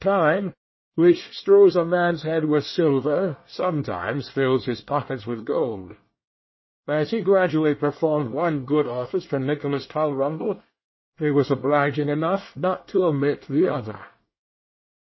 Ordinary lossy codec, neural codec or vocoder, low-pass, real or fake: MP3, 24 kbps; codec, 24 kHz, 1 kbps, SNAC; 7.2 kHz; fake